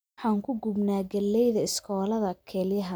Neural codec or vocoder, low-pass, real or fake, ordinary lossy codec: none; none; real; none